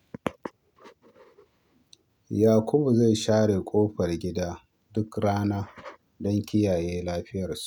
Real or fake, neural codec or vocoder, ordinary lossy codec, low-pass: real; none; none; 19.8 kHz